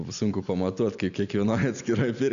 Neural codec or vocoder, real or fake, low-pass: none; real; 7.2 kHz